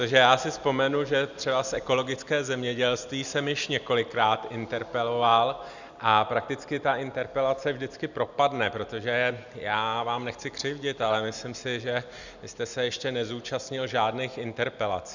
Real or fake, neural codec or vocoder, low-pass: real; none; 7.2 kHz